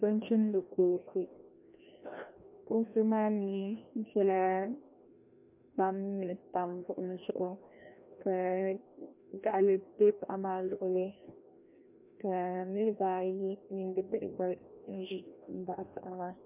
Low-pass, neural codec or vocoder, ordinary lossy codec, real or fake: 3.6 kHz; codec, 16 kHz, 1 kbps, FreqCodec, larger model; MP3, 32 kbps; fake